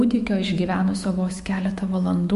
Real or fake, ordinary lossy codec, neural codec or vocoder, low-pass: fake; MP3, 48 kbps; autoencoder, 48 kHz, 128 numbers a frame, DAC-VAE, trained on Japanese speech; 14.4 kHz